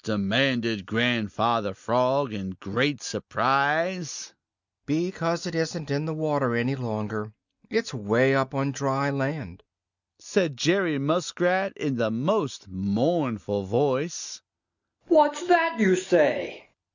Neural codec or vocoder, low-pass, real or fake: none; 7.2 kHz; real